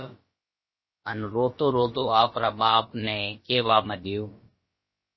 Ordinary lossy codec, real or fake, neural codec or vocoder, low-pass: MP3, 24 kbps; fake; codec, 16 kHz, about 1 kbps, DyCAST, with the encoder's durations; 7.2 kHz